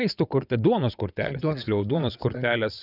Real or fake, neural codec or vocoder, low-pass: fake; vocoder, 22.05 kHz, 80 mel bands, WaveNeXt; 5.4 kHz